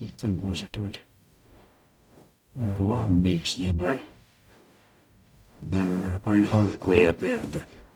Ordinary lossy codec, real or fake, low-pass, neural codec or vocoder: none; fake; 19.8 kHz; codec, 44.1 kHz, 0.9 kbps, DAC